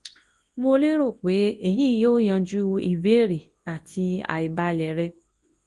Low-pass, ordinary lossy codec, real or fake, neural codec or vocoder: 10.8 kHz; Opus, 24 kbps; fake; codec, 24 kHz, 0.9 kbps, WavTokenizer, large speech release